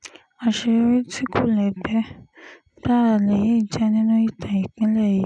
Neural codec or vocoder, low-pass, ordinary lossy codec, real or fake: none; 10.8 kHz; none; real